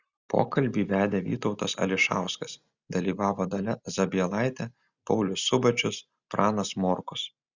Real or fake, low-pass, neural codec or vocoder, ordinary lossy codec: real; 7.2 kHz; none; Opus, 64 kbps